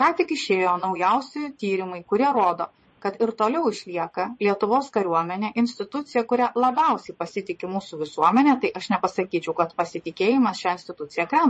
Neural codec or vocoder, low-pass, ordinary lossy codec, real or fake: vocoder, 22.05 kHz, 80 mel bands, Vocos; 9.9 kHz; MP3, 32 kbps; fake